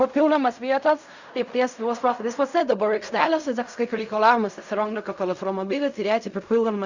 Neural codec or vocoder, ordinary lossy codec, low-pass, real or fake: codec, 16 kHz in and 24 kHz out, 0.4 kbps, LongCat-Audio-Codec, fine tuned four codebook decoder; Opus, 64 kbps; 7.2 kHz; fake